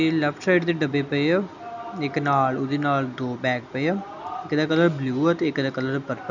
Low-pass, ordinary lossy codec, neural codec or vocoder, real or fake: 7.2 kHz; none; none; real